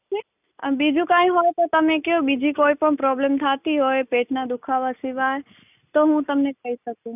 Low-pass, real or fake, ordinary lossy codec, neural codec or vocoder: 3.6 kHz; real; none; none